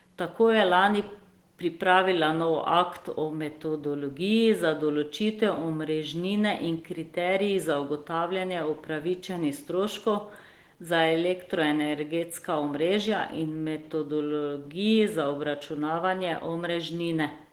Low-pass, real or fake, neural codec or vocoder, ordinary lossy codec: 19.8 kHz; real; none; Opus, 16 kbps